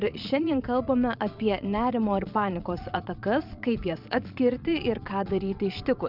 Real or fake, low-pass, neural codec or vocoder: fake; 5.4 kHz; vocoder, 22.05 kHz, 80 mel bands, Vocos